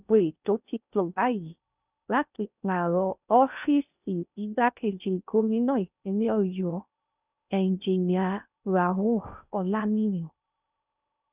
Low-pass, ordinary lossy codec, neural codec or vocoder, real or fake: 3.6 kHz; none; codec, 16 kHz in and 24 kHz out, 0.6 kbps, FocalCodec, streaming, 4096 codes; fake